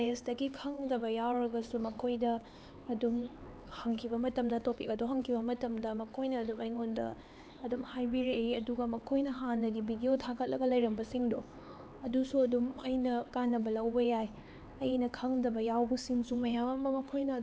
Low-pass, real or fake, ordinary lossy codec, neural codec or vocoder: none; fake; none; codec, 16 kHz, 4 kbps, X-Codec, HuBERT features, trained on LibriSpeech